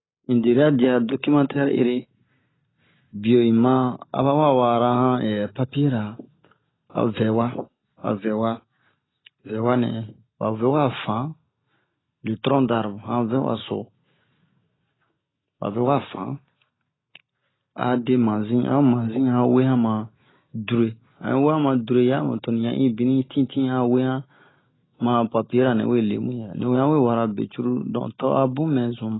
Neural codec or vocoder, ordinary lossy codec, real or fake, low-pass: none; AAC, 16 kbps; real; 7.2 kHz